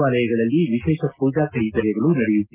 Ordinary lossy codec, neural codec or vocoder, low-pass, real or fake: Opus, 32 kbps; none; 3.6 kHz; real